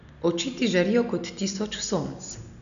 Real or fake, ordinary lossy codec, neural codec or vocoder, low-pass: real; none; none; 7.2 kHz